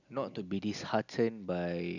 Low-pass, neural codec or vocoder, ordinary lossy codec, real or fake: 7.2 kHz; none; none; real